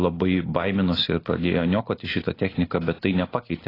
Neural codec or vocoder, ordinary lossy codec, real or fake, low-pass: none; AAC, 24 kbps; real; 5.4 kHz